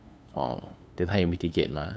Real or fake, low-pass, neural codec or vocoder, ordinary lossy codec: fake; none; codec, 16 kHz, 2 kbps, FunCodec, trained on LibriTTS, 25 frames a second; none